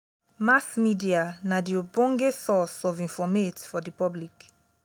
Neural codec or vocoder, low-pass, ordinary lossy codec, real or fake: none; none; none; real